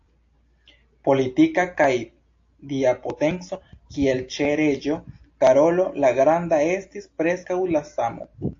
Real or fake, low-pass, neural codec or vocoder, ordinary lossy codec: real; 7.2 kHz; none; AAC, 48 kbps